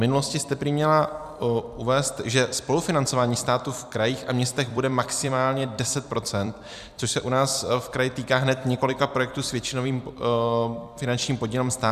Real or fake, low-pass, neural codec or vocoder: fake; 14.4 kHz; vocoder, 44.1 kHz, 128 mel bands every 256 samples, BigVGAN v2